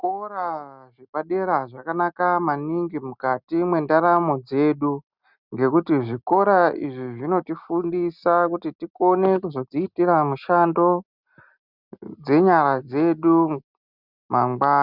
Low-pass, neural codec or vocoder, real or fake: 5.4 kHz; none; real